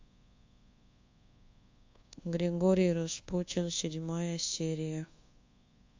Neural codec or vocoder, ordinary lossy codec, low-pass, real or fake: codec, 24 kHz, 1.2 kbps, DualCodec; AAC, 48 kbps; 7.2 kHz; fake